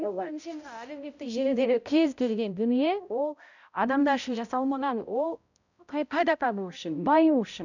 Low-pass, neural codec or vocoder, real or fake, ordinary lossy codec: 7.2 kHz; codec, 16 kHz, 0.5 kbps, X-Codec, HuBERT features, trained on balanced general audio; fake; none